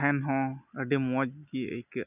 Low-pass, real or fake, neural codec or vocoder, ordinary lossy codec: 3.6 kHz; real; none; none